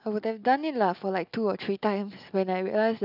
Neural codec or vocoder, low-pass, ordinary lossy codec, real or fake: none; 5.4 kHz; none; real